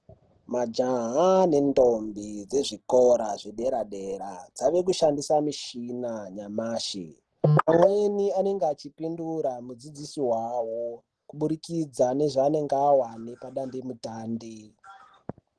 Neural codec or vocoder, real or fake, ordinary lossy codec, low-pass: none; real; Opus, 16 kbps; 10.8 kHz